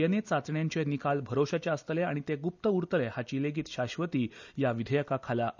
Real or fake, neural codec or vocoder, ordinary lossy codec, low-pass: real; none; none; 7.2 kHz